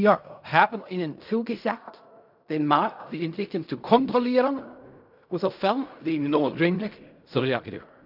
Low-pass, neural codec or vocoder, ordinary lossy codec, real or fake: 5.4 kHz; codec, 16 kHz in and 24 kHz out, 0.4 kbps, LongCat-Audio-Codec, fine tuned four codebook decoder; none; fake